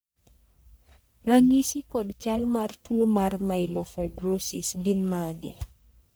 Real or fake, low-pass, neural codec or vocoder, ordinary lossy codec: fake; none; codec, 44.1 kHz, 1.7 kbps, Pupu-Codec; none